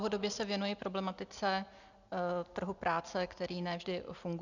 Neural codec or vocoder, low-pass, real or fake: none; 7.2 kHz; real